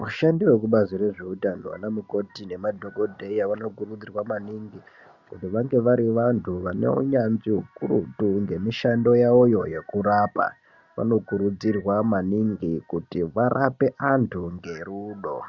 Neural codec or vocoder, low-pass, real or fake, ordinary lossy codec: none; 7.2 kHz; real; Opus, 64 kbps